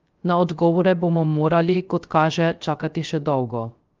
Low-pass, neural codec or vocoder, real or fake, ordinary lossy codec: 7.2 kHz; codec, 16 kHz, 0.3 kbps, FocalCodec; fake; Opus, 32 kbps